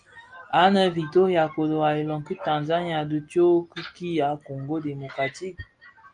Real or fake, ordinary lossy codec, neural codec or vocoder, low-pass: real; Opus, 32 kbps; none; 9.9 kHz